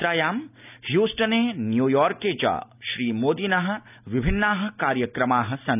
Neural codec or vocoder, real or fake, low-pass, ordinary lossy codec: none; real; 3.6 kHz; none